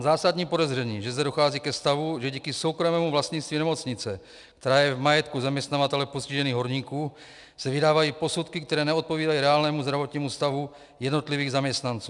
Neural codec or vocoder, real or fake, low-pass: none; real; 10.8 kHz